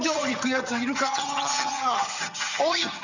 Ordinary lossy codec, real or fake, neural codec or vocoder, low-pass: none; fake; vocoder, 22.05 kHz, 80 mel bands, HiFi-GAN; 7.2 kHz